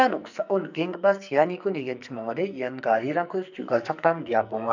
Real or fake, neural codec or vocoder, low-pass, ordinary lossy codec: fake; codec, 44.1 kHz, 2.6 kbps, SNAC; 7.2 kHz; none